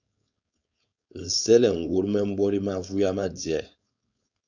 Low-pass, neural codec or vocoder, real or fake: 7.2 kHz; codec, 16 kHz, 4.8 kbps, FACodec; fake